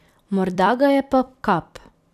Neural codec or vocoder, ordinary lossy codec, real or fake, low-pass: vocoder, 44.1 kHz, 128 mel bands every 256 samples, BigVGAN v2; none; fake; 14.4 kHz